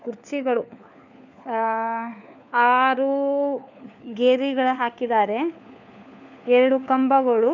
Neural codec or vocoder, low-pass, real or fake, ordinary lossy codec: codec, 16 kHz, 4 kbps, FunCodec, trained on LibriTTS, 50 frames a second; 7.2 kHz; fake; none